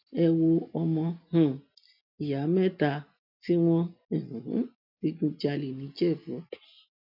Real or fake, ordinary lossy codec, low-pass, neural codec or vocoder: real; MP3, 48 kbps; 5.4 kHz; none